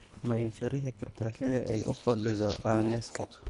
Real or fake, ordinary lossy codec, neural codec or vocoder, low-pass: fake; none; codec, 24 kHz, 1.5 kbps, HILCodec; 10.8 kHz